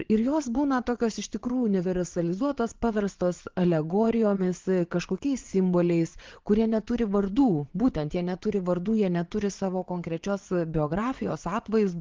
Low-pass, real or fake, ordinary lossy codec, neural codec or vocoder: 7.2 kHz; fake; Opus, 16 kbps; vocoder, 22.05 kHz, 80 mel bands, Vocos